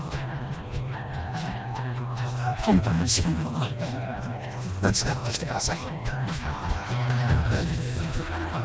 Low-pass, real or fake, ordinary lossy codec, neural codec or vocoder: none; fake; none; codec, 16 kHz, 1 kbps, FreqCodec, smaller model